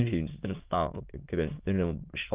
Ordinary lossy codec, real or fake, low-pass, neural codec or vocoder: Opus, 32 kbps; fake; 3.6 kHz; autoencoder, 22.05 kHz, a latent of 192 numbers a frame, VITS, trained on many speakers